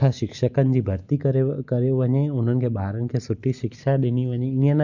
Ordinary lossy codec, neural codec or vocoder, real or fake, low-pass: none; none; real; 7.2 kHz